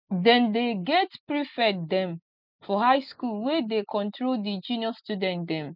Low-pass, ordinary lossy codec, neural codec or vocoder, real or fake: 5.4 kHz; none; none; real